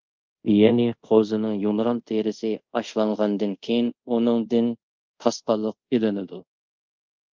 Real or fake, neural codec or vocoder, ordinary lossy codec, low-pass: fake; codec, 24 kHz, 0.5 kbps, DualCodec; Opus, 24 kbps; 7.2 kHz